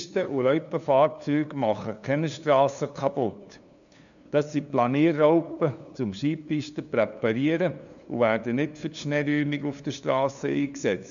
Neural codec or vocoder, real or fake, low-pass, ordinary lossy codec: codec, 16 kHz, 2 kbps, FunCodec, trained on LibriTTS, 25 frames a second; fake; 7.2 kHz; none